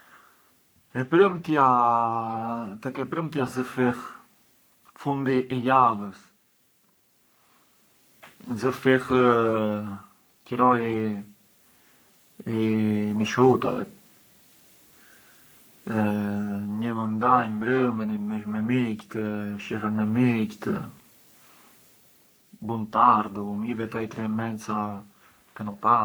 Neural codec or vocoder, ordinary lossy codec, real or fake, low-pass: codec, 44.1 kHz, 3.4 kbps, Pupu-Codec; none; fake; none